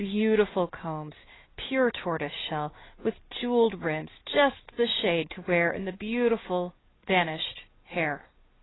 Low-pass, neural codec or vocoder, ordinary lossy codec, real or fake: 7.2 kHz; none; AAC, 16 kbps; real